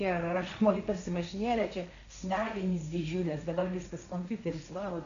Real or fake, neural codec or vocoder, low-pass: fake; codec, 16 kHz, 1.1 kbps, Voila-Tokenizer; 7.2 kHz